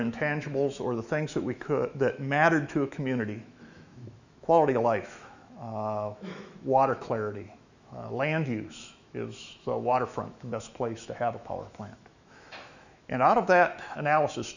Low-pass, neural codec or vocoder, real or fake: 7.2 kHz; autoencoder, 48 kHz, 128 numbers a frame, DAC-VAE, trained on Japanese speech; fake